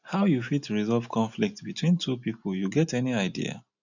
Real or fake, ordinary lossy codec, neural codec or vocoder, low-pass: real; none; none; 7.2 kHz